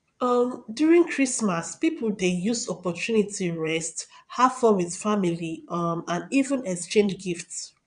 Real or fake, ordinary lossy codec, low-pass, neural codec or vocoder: fake; none; 9.9 kHz; vocoder, 22.05 kHz, 80 mel bands, Vocos